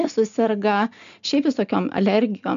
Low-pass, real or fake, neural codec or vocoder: 7.2 kHz; real; none